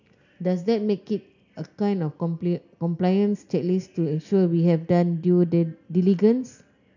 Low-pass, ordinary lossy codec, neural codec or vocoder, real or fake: 7.2 kHz; none; none; real